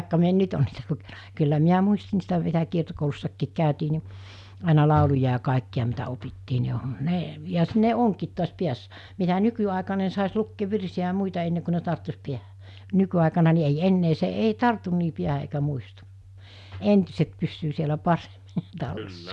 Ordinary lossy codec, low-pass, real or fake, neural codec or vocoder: none; none; real; none